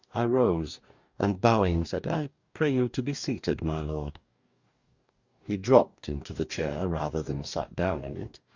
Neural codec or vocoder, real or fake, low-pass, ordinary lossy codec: codec, 44.1 kHz, 2.6 kbps, DAC; fake; 7.2 kHz; Opus, 64 kbps